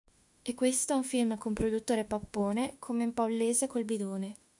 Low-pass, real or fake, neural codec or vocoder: 10.8 kHz; fake; autoencoder, 48 kHz, 32 numbers a frame, DAC-VAE, trained on Japanese speech